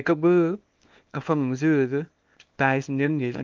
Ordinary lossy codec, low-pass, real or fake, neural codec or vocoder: Opus, 24 kbps; 7.2 kHz; fake; codec, 24 kHz, 0.9 kbps, WavTokenizer, small release